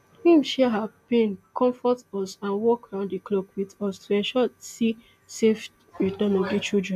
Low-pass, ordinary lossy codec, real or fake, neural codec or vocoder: 14.4 kHz; none; real; none